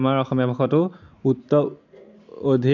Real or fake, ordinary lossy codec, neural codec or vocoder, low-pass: real; none; none; 7.2 kHz